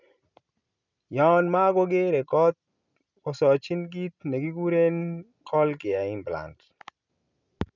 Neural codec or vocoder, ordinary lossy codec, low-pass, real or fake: none; none; 7.2 kHz; real